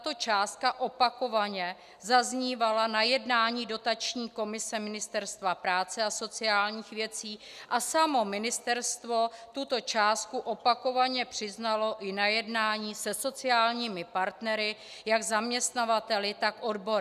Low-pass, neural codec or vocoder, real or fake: 14.4 kHz; none; real